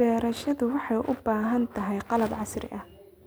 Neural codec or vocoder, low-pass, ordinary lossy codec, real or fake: none; none; none; real